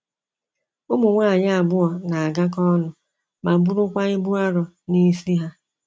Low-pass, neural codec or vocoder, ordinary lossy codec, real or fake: none; none; none; real